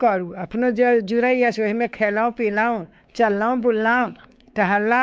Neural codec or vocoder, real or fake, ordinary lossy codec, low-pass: codec, 16 kHz, 4 kbps, X-Codec, WavLM features, trained on Multilingual LibriSpeech; fake; none; none